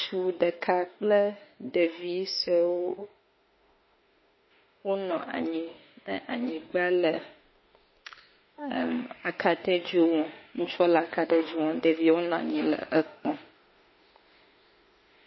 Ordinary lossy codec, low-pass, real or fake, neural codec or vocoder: MP3, 24 kbps; 7.2 kHz; fake; autoencoder, 48 kHz, 32 numbers a frame, DAC-VAE, trained on Japanese speech